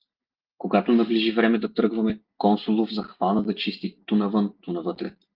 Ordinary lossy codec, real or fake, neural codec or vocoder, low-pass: Opus, 32 kbps; real; none; 5.4 kHz